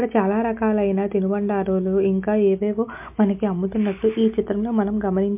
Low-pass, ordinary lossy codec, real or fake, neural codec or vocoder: 3.6 kHz; MP3, 32 kbps; real; none